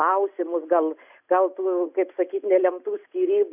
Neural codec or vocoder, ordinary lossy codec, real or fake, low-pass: none; AAC, 32 kbps; real; 3.6 kHz